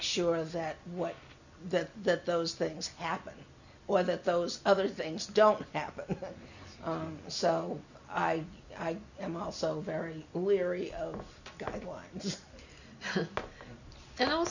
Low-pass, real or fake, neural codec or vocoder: 7.2 kHz; real; none